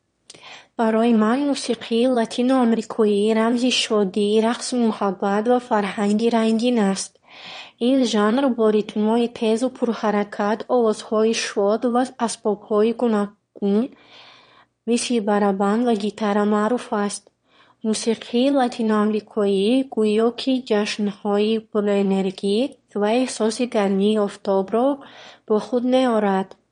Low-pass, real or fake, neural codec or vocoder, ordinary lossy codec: 9.9 kHz; fake; autoencoder, 22.05 kHz, a latent of 192 numbers a frame, VITS, trained on one speaker; MP3, 48 kbps